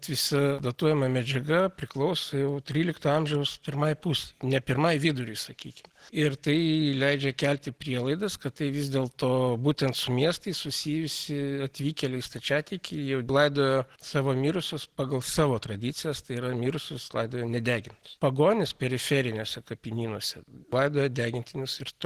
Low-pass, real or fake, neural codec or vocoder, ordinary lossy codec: 14.4 kHz; real; none; Opus, 16 kbps